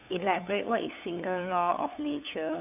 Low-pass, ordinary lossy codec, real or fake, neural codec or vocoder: 3.6 kHz; none; fake; codec, 16 kHz, 8 kbps, FunCodec, trained on LibriTTS, 25 frames a second